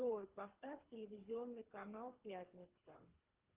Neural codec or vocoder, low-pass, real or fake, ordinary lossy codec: codec, 24 kHz, 3 kbps, HILCodec; 3.6 kHz; fake; Opus, 32 kbps